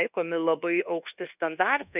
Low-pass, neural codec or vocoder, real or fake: 3.6 kHz; codec, 24 kHz, 1.2 kbps, DualCodec; fake